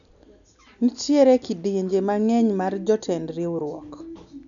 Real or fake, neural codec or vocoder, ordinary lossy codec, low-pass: real; none; none; 7.2 kHz